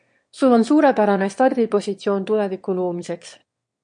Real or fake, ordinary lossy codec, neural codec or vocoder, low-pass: fake; MP3, 48 kbps; autoencoder, 22.05 kHz, a latent of 192 numbers a frame, VITS, trained on one speaker; 9.9 kHz